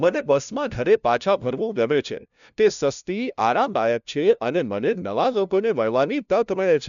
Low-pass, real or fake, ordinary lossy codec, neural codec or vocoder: 7.2 kHz; fake; none; codec, 16 kHz, 0.5 kbps, FunCodec, trained on LibriTTS, 25 frames a second